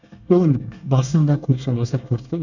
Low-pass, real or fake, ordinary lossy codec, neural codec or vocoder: 7.2 kHz; fake; none; codec, 24 kHz, 1 kbps, SNAC